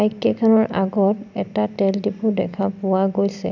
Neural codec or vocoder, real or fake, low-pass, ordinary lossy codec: none; real; 7.2 kHz; none